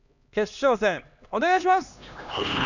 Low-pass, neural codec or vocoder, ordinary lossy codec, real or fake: 7.2 kHz; codec, 16 kHz, 2 kbps, X-Codec, HuBERT features, trained on LibriSpeech; none; fake